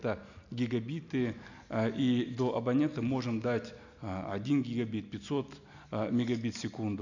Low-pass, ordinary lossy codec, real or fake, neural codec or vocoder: 7.2 kHz; none; real; none